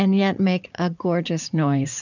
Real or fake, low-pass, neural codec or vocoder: fake; 7.2 kHz; vocoder, 44.1 kHz, 80 mel bands, Vocos